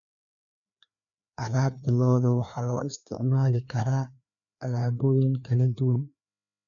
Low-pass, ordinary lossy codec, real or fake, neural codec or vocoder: 7.2 kHz; none; fake; codec, 16 kHz, 2 kbps, FreqCodec, larger model